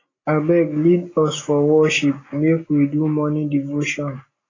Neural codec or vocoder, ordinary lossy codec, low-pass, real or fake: none; AAC, 32 kbps; 7.2 kHz; real